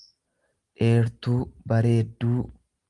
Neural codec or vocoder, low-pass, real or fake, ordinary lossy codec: none; 10.8 kHz; real; Opus, 24 kbps